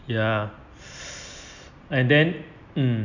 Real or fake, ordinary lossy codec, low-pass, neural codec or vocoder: real; none; 7.2 kHz; none